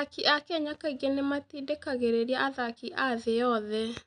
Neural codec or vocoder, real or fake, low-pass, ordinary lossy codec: none; real; 9.9 kHz; none